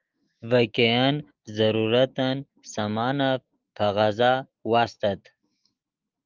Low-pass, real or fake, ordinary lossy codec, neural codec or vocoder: 7.2 kHz; fake; Opus, 32 kbps; autoencoder, 48 kHz, 128 numbers a frame, DAC-VAE, trained on Japanese speech